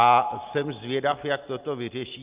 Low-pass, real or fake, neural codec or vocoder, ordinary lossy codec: 3.6 kHz; real; none; Opus, 24 kbps